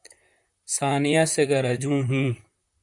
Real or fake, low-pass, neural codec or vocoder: fake; 10.8 kHz; vocoder, 44.1 kHz, 128 mel bands, Pupu-Vocoder